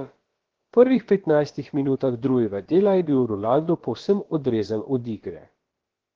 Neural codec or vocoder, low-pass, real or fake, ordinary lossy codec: codec, 16 kHz, about 1 kbps, DyCAST, with the encoder's durations; 7.2 kHz; fake; Opus, 16 kbps